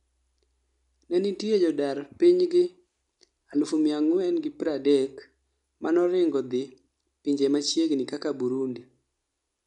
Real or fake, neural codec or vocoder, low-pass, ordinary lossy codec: real; none; 10.8 kHz; none